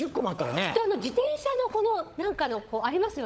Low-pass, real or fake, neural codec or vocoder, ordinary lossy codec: none; fake; codec, 16 kHz, 16 kbps, FunCodec, trained on LibriTTS, 50 frames a second; none